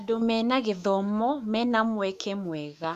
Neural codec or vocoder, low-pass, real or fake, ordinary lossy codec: autoencoder, 48 kHz, 128 numbers a frame, DAC-VAE, trained on Japanese speech; 14.4 kHz; fake; AAC, 96 kbps